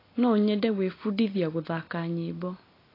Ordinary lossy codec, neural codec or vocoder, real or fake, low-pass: AAC, 24 kbps; none; real; 5.4 kHz